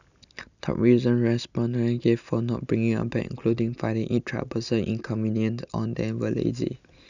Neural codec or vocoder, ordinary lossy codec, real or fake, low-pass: none; none; real; 7.2 kHz